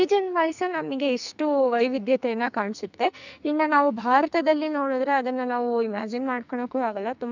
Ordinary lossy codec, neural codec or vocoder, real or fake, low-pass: none; codec, 44.1 kHz, 2.6 kbps, SNAC; fake; 7.2 kHz